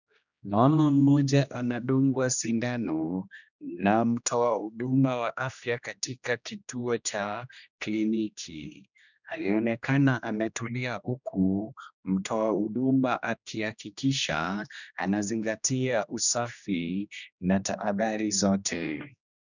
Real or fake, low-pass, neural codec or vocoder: fake; 7.2 kHz; codec, 16 kHz, 1 kbps, X-Codec, HuBERT features, trained on general audio